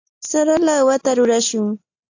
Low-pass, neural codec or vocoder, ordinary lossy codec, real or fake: 7.2 kHz; none; AAC, 48 kbps; real